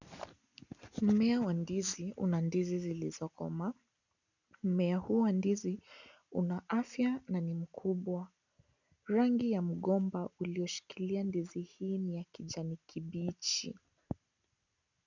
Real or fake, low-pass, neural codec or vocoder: real; 7.2 kHz; none